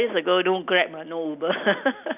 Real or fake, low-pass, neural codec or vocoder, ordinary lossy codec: real; 3.6 kHz; none; none